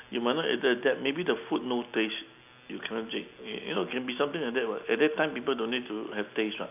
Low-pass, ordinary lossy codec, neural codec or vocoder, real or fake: 3.6 kHz; none; none; real